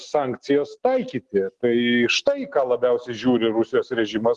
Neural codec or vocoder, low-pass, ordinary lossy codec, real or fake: none; 10.8 kHz; Opus, 16 kbps; real